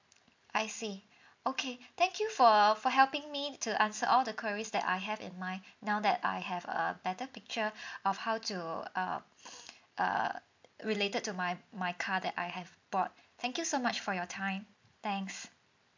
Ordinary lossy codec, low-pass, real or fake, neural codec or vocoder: MP3, 64 kbps; 7.2 kHz; real; none